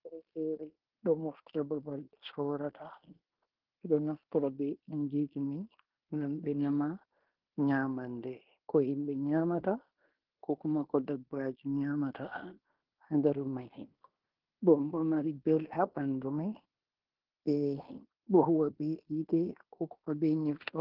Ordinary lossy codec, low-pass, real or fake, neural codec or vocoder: Opus, 16 kbps; 5.4 kHz; fake; codec, 16 kHz in and 24 kHz out, 0.9 kbps, LongCat-Audio-Codec, fine tuned four codebook decoder